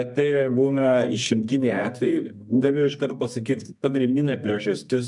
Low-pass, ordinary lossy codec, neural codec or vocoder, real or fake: 10.8 kHz; MP3, 96 kbps; codec, 24 kHz, 0.9 kbps, WavTokenizer, medium music audio release; fake